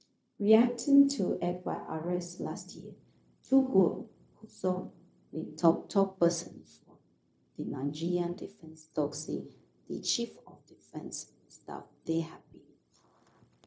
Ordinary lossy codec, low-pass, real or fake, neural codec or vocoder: none; none; fake; codec, 16 kHz, 0.4 kbps, LongCat-Audio-Codec